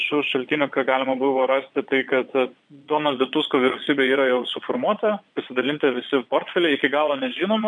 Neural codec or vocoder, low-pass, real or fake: vocoder, 22.05 kHz, 80 mel bands, Vocos; 9.9 kHz; fake